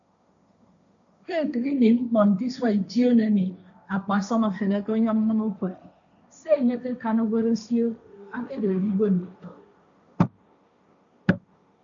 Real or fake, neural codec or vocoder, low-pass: fake; codec, 16 kHz, 1.1 kbps, Voila-Tokenizer; 7.2 kHz